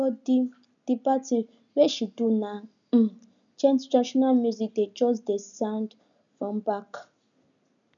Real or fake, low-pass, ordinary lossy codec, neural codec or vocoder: real; 7.2 kHz; none; none